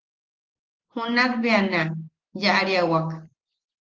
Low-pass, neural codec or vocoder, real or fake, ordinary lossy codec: 7.2 kHz; none; real; Opus, 16 kbps